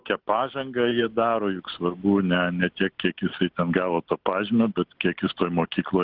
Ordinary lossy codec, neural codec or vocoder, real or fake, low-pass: Opus, 16 kbps; none; real; 5.4 kHz